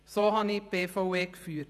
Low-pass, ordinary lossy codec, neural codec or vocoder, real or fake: 14.4 kHz; none; vocoder, 48 kHz, 128 mel bands, Vocos; fake